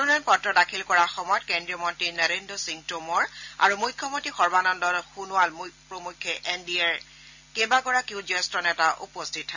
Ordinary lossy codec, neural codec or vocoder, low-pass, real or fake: none; none; 7.2 kHz; real